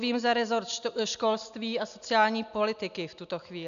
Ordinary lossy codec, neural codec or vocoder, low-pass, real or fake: AAC, 96 kbps; none; 7.2 kHz; real